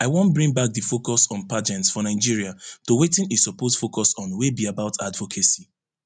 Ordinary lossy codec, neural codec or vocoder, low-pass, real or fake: none; none; 9.9 kHz; real